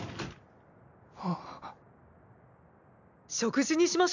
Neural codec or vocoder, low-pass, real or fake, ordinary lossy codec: none; 7.2 kHz; real; none